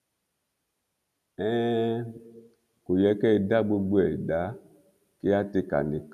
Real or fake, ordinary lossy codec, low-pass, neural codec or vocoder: real; none; 14.4 kHz; none